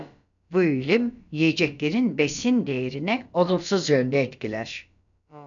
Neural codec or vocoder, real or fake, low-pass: codec, 16 kHz, about 1 kbps, DyCAST, with the encoder's durations; fake; 7.2 kHz